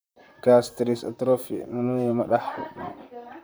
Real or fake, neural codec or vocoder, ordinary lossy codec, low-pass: fake; vocoder, 44.1 kHz, 128 mel bands, Pupu-Vocoder; none; none